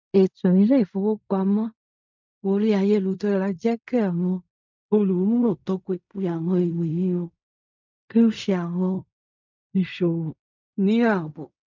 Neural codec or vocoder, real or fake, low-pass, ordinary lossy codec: codec, 16 kHz in and 24 kHz out, 0.4 kbps, LongCat-Audio-Codec, fine tuned four codebook decoder; fake; 7.2 kHz; MP3, 64 kbps